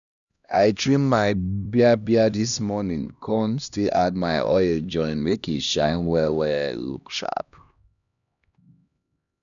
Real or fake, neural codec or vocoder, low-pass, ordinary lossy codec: fake; codec, 16 kHz, 1 kbps, X-Codec, HuBERT features, trained on LibriSpeech; 7.2 kHz; none